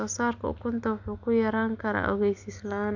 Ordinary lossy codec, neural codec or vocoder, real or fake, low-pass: none; none; real; 7.2 kHz